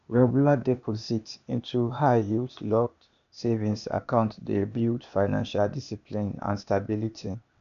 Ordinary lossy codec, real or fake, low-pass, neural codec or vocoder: none; fake; 7.2 kHz; codec, 16 kHz, 0.8 kbps, ZipCodec